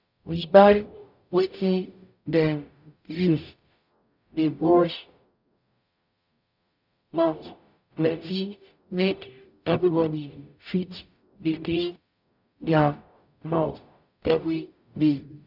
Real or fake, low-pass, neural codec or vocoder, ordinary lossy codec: fake; 5.4 kHz; codec, 44.1 kHz, 0.9 kbps, DAC; none